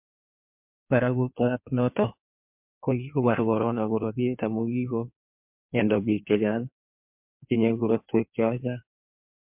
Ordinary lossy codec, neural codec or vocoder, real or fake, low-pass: MP3, 32 kbps; codec, 16 kHz in and 24 kHz out, 1.1 kbps, FireRedTTS-2 codec; fake; 3.6 kHz